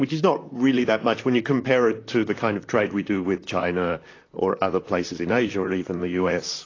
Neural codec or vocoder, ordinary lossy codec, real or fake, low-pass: vocoder, 44.1 kHz, 128 mel bands, Pupu-Vocoder; AAC, 32 kbps; fake; 7.2 kHz